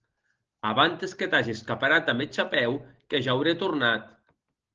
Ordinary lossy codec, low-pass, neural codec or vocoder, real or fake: Opus, 16 kbps; 7.2 kHz; none; real